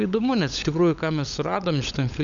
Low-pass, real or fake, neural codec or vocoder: 7.2 kHz; fake; codec, 16 kHz, 16 kbps, FunCodec, trained on LibriTTS, 50 frames a second